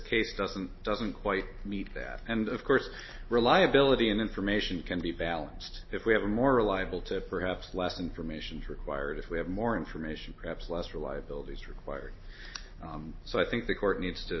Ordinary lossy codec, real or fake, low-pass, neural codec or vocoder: MP3, 24 kbps; real; 7.2 kHz; none